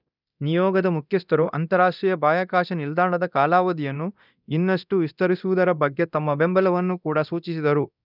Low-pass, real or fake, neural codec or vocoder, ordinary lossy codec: 5.4 kHz; fake; codec, 24 kHz, 0.9 kbps, DualCodec; none